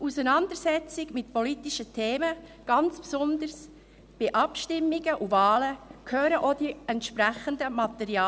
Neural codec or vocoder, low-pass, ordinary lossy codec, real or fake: none; none; none; real